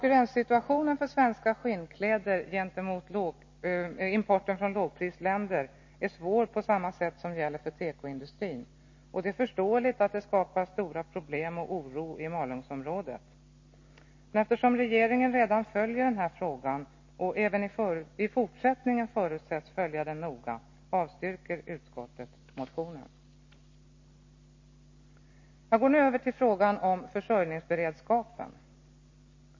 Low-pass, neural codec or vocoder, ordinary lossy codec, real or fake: 7.2 kHz; none; MP3, 32 kbps; real